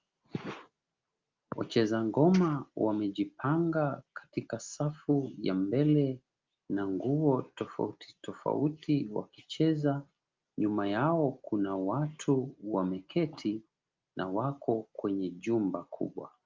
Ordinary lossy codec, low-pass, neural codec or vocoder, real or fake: Opus, 32 kbps; 7.2 kHz; none; real